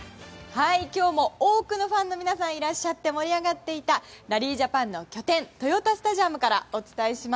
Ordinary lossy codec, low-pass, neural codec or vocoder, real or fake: none; none; none; real